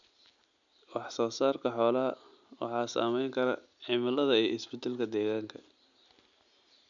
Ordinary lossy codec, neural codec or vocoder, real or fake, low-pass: none; none; real; 7.2 kHz